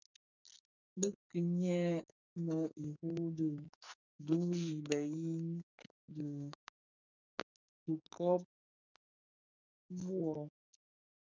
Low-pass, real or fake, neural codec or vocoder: 7.2 kHz; fake; codec, 44.1 kHz, 2.6 kbps, SNAC